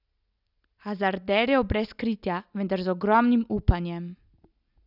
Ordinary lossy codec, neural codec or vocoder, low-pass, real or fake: none; none; 5.4 kHz; real